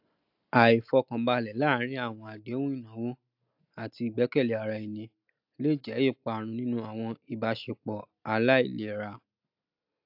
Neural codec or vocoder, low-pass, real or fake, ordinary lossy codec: none; 5.4 kHz; real; AAC, 48 kbps